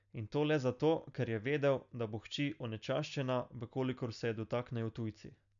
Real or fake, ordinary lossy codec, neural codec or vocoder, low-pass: real; none; none; 7.2 kHz